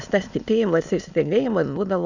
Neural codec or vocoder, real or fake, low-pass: autoencoder, 22.05 kHz, a latent of 192 numbers a frame, VITS, trained on many speakers; fake; 7.2 kHz